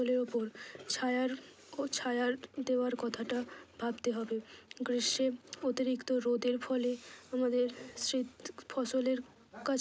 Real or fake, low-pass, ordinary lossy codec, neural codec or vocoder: real; none; none; none